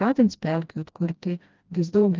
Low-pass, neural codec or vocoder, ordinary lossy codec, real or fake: 7.2 kHz; codec, 16 kHz, 1 kbps, FreqCodec, smaller model; Opus, 32 kbps; fake